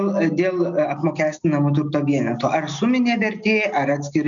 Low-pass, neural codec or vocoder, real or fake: 7.2 kHz; none; real